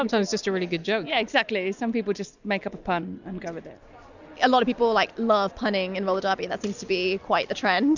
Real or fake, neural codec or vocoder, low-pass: real; none; 7.2 kHz